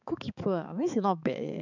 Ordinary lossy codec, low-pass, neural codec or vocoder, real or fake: none; 7.2 kHz; codec, 16 kHz, 4 kbps, X-Codec, HuBERT features, trained on balanced general audio; fake